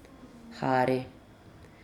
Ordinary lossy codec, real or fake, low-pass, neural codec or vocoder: none; real; 19.8 kHz; none